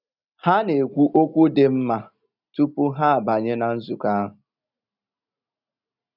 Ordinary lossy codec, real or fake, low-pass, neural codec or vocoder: none; real; 5.4 kHz; none